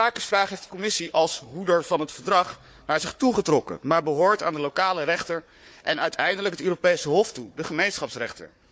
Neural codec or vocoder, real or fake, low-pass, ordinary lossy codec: codec, 16 kHz, 4 kbps, FunCodec, trained on Chinese and English, 50 frames a second; fake; none; none